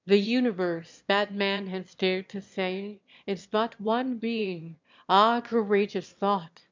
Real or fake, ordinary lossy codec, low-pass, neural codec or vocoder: fake; MP3, 64 kbps; 7.2 kHz; autoencoder, 22.05 kHz, a latent of 192 numbers a frame, VITS, trained on one speaker